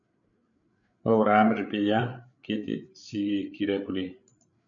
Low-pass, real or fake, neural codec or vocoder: 7.2 kHz; fake; codec, 16 kHz, 8 kbps, FreqCodec, larger model